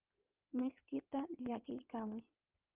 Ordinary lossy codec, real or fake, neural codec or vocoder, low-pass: Opus, 32 kbps; fake; codec, 16 kHz in and 24 kHz out, 2.2 kbps, FireRedTTS-2 codec; 3.6 kHz